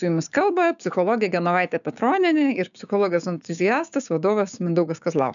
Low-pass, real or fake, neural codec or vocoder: 7.2 kHz; fake; codec, 16 kHz, 6 kbps, DAC